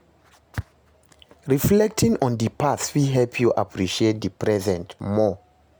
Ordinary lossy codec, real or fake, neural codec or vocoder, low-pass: none; real; none; none